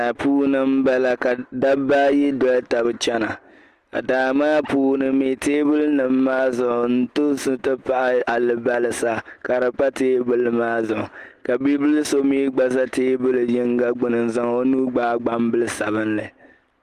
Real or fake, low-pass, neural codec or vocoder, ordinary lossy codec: real; 14.4 kHz; none; Opus, 24 kbps